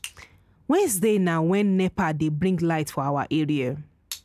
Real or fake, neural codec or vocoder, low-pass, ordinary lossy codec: real; none; 14.4 kHz; none